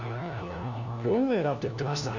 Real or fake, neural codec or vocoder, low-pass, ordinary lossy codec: fake; codec, 16 kHz, 1 kbps, FunCodec, trained on LibriTTS, 50 frames a second; 7.2 kHz; none